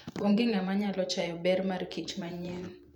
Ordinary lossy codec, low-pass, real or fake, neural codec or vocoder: none; 19.8 kHz; fake; vocoder, 44.1 kHz, 128 mel bands every 512 samples, BigVGAN v2